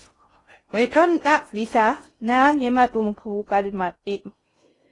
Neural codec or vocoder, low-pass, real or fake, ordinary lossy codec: codec, 16 kHz in and 24 kHz out, 0.6 kbps, FocalCodec, streaming, 2048 codes; 10.8 kHz; fake; AAC, 32 kbps